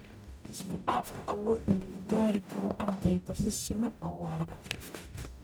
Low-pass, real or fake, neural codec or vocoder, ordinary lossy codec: none; fake; codec, 44.1 kHz, 0.9 kbps, DAC; none